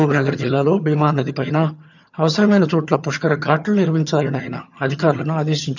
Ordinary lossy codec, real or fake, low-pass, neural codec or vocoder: none; fake; 7.2 kHz; vocoder, 22.05 kHz, 80 mel bands, HiFi-GAN